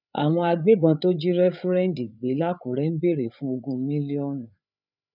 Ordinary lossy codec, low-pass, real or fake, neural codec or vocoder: none; 5.4 kHz; fake; codec, 16 kHz, 8 kbps, FreqCodec, larger model